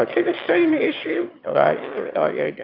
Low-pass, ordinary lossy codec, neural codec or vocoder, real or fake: 5.4 kHz; AAC, 32 kbps; autoencoder, 22.05 kHz, a latent of 192 numbers a frame, VITS, trained on one speaker; fake